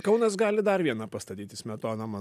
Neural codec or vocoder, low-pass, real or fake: none; 14.4 kHz; real